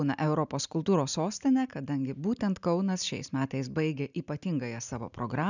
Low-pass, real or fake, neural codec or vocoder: 7.2 kHz; real; none